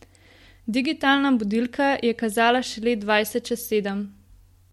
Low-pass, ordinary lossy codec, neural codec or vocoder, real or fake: 19.8 kHz; MP3, 64 kbps; none; real